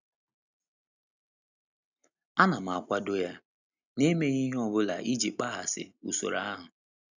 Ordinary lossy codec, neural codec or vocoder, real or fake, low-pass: none; none; real; 7.2 kHz